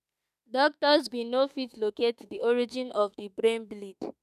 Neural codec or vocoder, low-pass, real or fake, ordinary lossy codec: autoencoder, 48 kHz, 32 numbers a frame, DAC-VAE, trained on Japanese speech; 14.4 kHz; fake; none